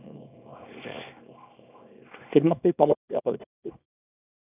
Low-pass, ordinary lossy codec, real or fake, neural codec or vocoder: 3.6 kHz; none; fake; codec, 24 kHz, 0.9 kbps, WavTokenizer, small release